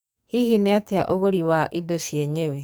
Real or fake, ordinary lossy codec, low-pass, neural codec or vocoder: fake; none; none; codec, 44.1 kHz, 2.6 kbps, SNAC